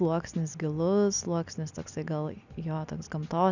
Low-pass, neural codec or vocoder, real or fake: 7.2 kHz; none; real